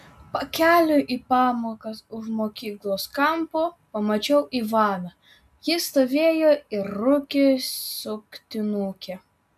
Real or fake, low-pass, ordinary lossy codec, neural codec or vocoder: real; 14.4 kHz; AAC, 96 kbps; none